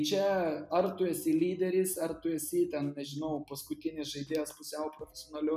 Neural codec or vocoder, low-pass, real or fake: none; 14.4 kHz; real